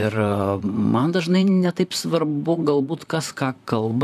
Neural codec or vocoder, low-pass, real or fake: vocoder, 44.1 kHz, 128 mel bands, Pupu-Vocoder; 14.4 kHz; fake